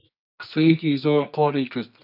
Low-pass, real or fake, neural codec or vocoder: 5.4 kHz; fake; codec, 24 kHz, 0.9 kbps, WavTokenizer, medium music audio release